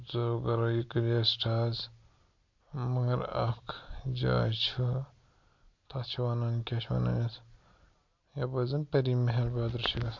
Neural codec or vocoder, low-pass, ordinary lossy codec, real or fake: none; 7.2 kHz; MP3, 48 kbps; real